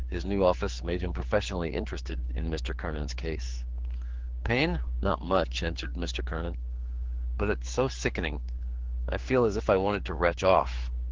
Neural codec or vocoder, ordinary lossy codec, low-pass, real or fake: codec, 16 kHz, 4 kbps, X-Codec, HuBERT features, trained on general audio; Opus, 16 kbps; 7.2 kHz; fake